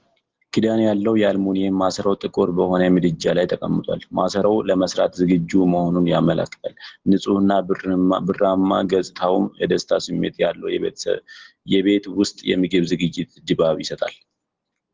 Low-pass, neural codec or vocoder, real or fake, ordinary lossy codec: 7.2 kHz; none; real; Opus, 16 kbps